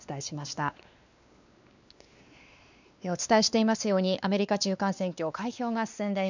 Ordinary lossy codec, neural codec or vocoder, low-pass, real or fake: none; codec, 16 kHz, 2 kbps, X-Codec, WavLM features, trained on Multilingual LibriSpeech; 7.2 kHz; fake